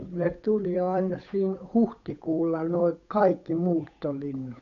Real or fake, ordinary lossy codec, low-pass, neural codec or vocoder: fake; none; 7.2 kHz; codec, 16 kHz, 8 kbps, FunCodec, trained on Chinese and English, 25 frames a second